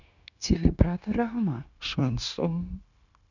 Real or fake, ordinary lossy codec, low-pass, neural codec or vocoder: fake; none; 7.2 kHz; codec, 16 kHz, 0.9 kbps, LongCat-Audio-Codec